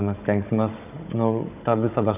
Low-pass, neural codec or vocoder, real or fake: 3.6 kHz; codec, 16 kHz, 16 kbps, FunCodec, trained on Chinese and English, 50 frames a second; fake